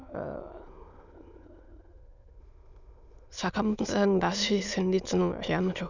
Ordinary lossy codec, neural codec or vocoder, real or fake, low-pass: none; autoencoder, 22.05 kHz, a latent of 192 numbers a frame, VITS, trained on many speakers; fake; 7.2 kHz